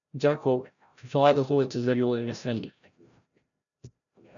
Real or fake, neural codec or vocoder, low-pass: fake; codec, 16 kHz, 0.5 kbps, FreqCodec, larger model; 7.2 kHz